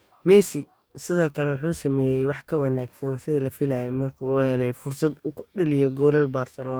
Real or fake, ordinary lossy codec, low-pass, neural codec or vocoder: fake; none; none; codec, 44.1 kHz, 2.6 kbps, DAC